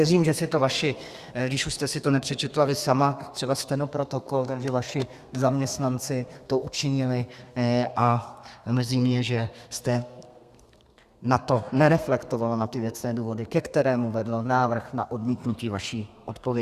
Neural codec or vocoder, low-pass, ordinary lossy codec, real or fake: codec, 32 kHz, 1.9 kbps, SNAC; 14.4 kHz; Opus, 64 kbps; fake